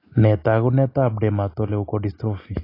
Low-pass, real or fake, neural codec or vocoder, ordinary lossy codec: 5.4 kHz; real; none; AAC, 24 kbps